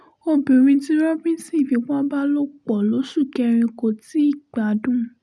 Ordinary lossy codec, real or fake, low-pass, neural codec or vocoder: none; real; none; none